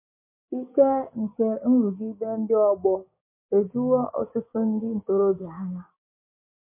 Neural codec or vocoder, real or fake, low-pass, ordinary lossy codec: codec, 16 kHz, 6 kbps, DAC; fake; 3.6 kHz; MP3, 24 kbps